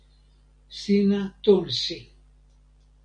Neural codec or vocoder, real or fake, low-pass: none; real; 9.9 kHz